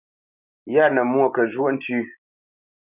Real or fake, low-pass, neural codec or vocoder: real; 3.6 kHz; none